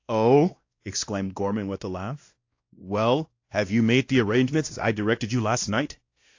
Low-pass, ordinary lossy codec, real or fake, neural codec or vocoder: 7.2 kHz; AAC, 48 kbps; fake; codec, 16 kHz, 1 kbps, X-Codec, WavLM features, trained on Multilingual LibriSpeech